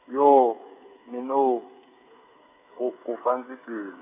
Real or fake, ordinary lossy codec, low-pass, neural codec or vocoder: fake; none; 3.6 kHz; codec, 16 kHz, 8 kbps, FreqCodec, smaller model